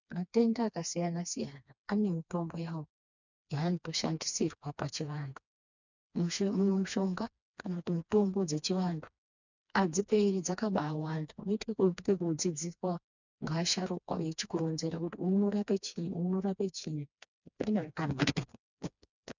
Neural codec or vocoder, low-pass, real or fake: codec, 16 kHz, 2 kbps, FreqCodec, smaller model; 7.2 kHz; fake